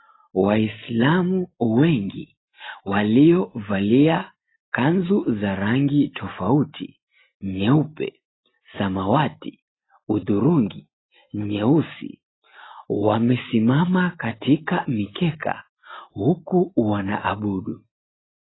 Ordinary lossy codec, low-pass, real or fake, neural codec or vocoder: AAC, 16 kbps; 7.2 kHz; real; none